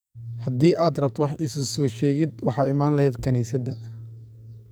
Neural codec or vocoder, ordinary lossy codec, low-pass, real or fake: codec, 44.1 kHz, 2.6 kbps, SNAC; none; none; fake